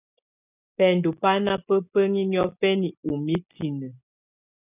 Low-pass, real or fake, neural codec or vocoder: 3.6 kHz; real; none